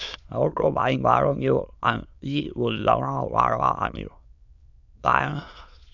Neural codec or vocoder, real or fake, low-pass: autoencoder, 22.05 kHz, a latent of 192 numbers a frame, VITS, trained on many speakers; fake; 7.2 kHz